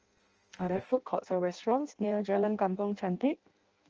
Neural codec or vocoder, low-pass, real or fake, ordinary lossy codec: codec, 16 kHz in and 24 kHz out, 0.6 kbps, FireRedTTS-2 codec; 7.2 kHz; fake; Opus, 24 kbps